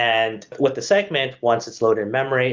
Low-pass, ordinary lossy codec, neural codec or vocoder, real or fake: 7.2 kHz; Opus, 32 kbps; none; real